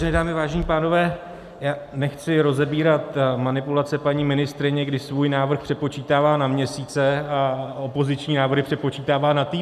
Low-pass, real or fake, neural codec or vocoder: 14.4 kHz; real; none